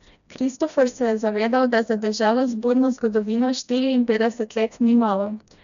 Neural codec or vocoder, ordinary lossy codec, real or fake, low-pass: codec, 16 kHz, 1 kbps, FreqCodec, smaller model; none; fake; 7.2 kHz